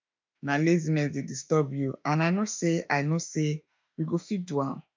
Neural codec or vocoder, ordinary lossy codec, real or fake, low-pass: autoencoder, 48 kHz, 32 numbers a frame, DAC-VAE, trained on Japanese speech; MP3, 64 kbps; fake; 7.2 kHz